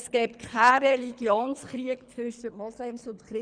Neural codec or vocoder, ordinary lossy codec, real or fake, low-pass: codec, 24 kHz, 3 kbps, HILCodec; none; fake; 9.9 kHz